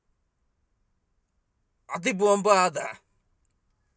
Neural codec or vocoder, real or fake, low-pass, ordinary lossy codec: none; real; none; none